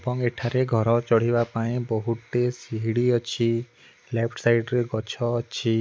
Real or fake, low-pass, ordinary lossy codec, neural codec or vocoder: real; none; none; none